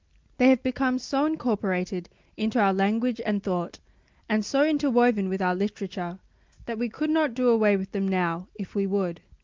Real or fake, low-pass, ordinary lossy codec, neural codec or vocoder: real; 7.2 kHz; Opus, 24 kbps; none